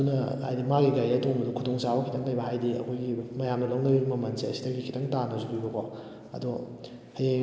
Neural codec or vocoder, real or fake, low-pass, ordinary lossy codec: none; real; none; none